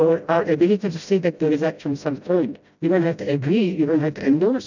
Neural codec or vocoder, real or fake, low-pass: codec, 16 kHz, 0.5 kbps, FreqCodec, smaller model; fake; 7.2 kHz